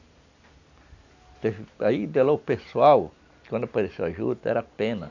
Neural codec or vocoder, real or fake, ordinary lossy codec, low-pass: none; real; none; 7.2 kHz